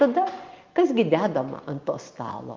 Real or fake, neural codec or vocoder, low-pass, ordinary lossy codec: real; none; 7.2 kHz; Opus, 32 kbps